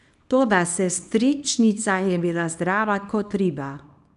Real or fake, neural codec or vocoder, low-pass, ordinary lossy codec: fake; codec, 24 kHz, 0.9 kbps, WavTokenizer, small release; 10.8 kHz; none